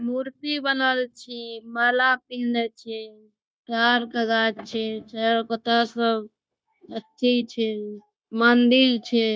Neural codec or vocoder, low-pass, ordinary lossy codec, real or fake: codec, 16 kHz, 0.9 kbps, LongCat-Audio-Codec; none; none; fake